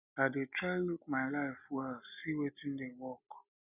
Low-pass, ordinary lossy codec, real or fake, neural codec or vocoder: 3.6 kHz; none; real; none